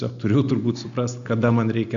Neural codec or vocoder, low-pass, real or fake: none; 7.2 kHz; real